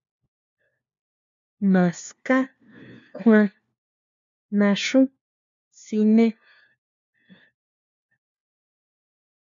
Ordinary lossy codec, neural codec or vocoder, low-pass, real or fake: MP3, 64 kbps; codec, 16 kHz, 1 kbps, FunCodec, trained on LibriTTS, 50 frames a second; 7.2 kHz; fake